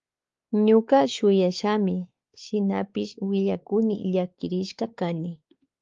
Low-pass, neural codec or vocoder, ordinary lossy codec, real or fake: 7.2 kHz; codec, 16 kHz, 4 kbps, X-Codec, WavLM features, trained on Multilingual LibriSpeech; Opus, 32 kbps; fake